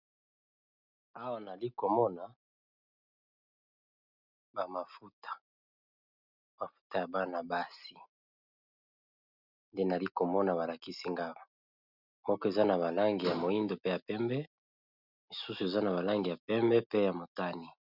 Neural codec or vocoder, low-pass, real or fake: none; 5.4 kHz; real